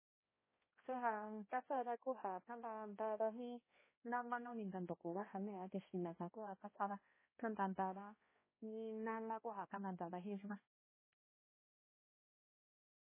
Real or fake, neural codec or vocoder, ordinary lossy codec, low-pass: fake; codec, 16 kHz, 1 kbps, X-Codec, HuBERT features, trained on balanced general audio; MP3, 16 kbps; 3.6 kHz